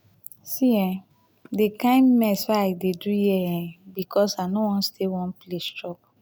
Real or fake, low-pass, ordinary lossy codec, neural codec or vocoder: real; none; none; none